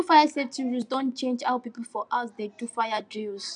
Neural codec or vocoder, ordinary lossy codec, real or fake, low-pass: none; none; real; 9.9 kHz